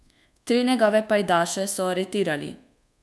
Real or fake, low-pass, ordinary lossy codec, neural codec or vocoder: fake; none; none; codec, 24 kHz, 1.2 kbps, DualCodec